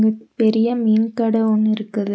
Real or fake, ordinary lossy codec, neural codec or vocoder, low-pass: real; none; none; none